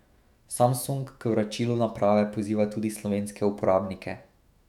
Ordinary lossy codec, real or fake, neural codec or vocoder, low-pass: none; fake; autoencoder, 48 kHz, 128 numbers a frame, DAC-VAE, trained on Japanese speech; 19.8 kHz